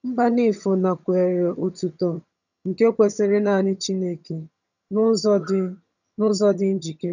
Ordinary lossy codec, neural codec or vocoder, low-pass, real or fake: none; vocoder, 22.05 kHz, 80 mel bands, HiFi-GAN; 7.2 kHz; fake